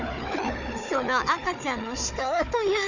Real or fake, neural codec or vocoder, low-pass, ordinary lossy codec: fake; codec, 16 kHz, 4 kbps, FunCodec, trained on Chinese and English, 50 frames a second; 7.2 kHz; none